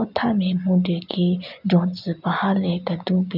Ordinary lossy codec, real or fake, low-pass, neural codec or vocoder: none; real; 5.4 kHz; none